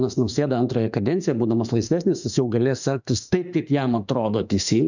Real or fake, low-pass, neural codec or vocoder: fake; 7.2 kHz; autoencoder, 48 kHz, 32 numbers a frame, DAC-VAE, trained on Japanese speech